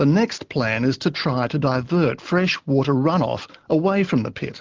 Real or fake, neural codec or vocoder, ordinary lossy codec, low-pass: real; none; Opus, 16 kbps; 7.2 kHz